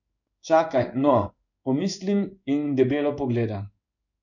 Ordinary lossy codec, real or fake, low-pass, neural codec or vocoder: none; fake; 7.2 kHz; codec, 16 kHz in and 24 kHz out, 1 kbps, XY-Tokenizer